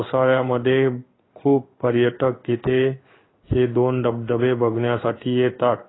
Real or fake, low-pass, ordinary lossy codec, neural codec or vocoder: fake; 7.2 kHz; AAC, 16 kbps; codec, 16 kHz in and 24 kHz out, 1 kbps, XY-Tokenizer